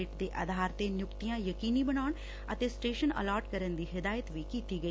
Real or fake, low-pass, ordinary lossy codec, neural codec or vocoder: real; none; none; none